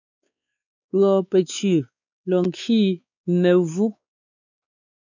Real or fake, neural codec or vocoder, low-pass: fake; codec, 16 kHz, 4 kbps, X-Codec, WavLM features, trained on Multilingual LibriSpeech; 7.2 kHz